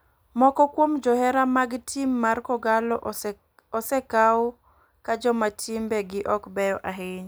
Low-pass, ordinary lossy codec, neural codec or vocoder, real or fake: none; none; none; real